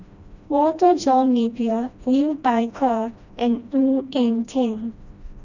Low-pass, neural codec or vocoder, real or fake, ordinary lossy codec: 7.2 kHz; codec, 16 kHz, 1 kbps, FreqCodec, smaller model; fake; none